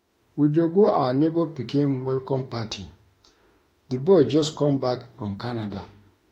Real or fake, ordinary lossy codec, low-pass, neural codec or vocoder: fake; AAC, 48 kbps; 19.8 kHz; autoencoder, 48 kHz, 32 numbers a frame, DAC-VAE, trained on Japanese speech